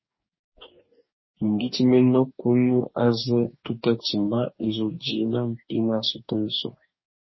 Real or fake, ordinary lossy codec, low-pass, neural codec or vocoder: fake; MP3, 24 kbps; 7.2 kHz; codec, 44.1 kHz, 2.6 kbps, DAC